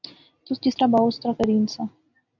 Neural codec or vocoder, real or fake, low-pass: none; real; 7.2 kHz